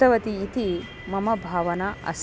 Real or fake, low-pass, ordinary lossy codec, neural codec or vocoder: real; none; none; none